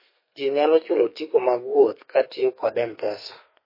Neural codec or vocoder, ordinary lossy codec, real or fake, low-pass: codec, 32 kHz, 1.9 kbps, SNAC; MP3, 24 kbps; fake; 5.4 kHz